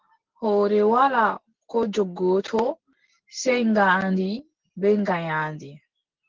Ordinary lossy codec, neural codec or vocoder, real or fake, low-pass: Opus, 16 kbps; none; real; 7.2 kHz